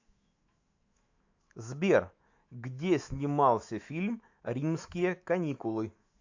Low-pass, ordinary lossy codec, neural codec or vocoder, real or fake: 7.2 kHz; AAC, 48 kbps; autoencoder, 48 kHz, 128 numbers a frame, DAC-VAE, trained on Japanese speech; fake